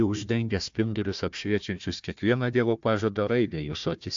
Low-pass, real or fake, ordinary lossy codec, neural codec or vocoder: 7.2 kHz; fake; AAC, 64 kbps; codec, 16 kHz, 1 kbps, FunCodec, trained on Chinese and English, 50 frames a second